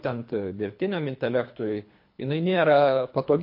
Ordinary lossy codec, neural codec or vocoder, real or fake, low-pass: MP3, 32 kbps; codec, 24 kHz, 3 kbps, HILCodec; fake; 5.4 kHz